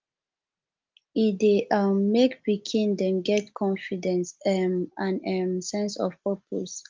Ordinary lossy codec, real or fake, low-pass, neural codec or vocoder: Opus, 32 kbps; real; 7.2 kHz; none